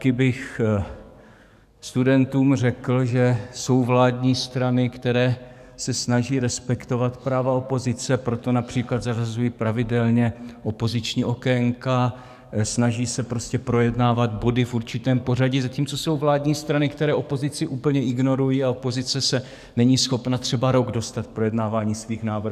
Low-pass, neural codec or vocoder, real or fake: 14.4 kHz; codec, 44.1 kHz, 7.8 kbps, DAC; fake